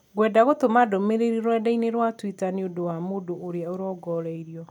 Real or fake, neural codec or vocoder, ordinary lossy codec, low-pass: real; none; none; 19.8 kHz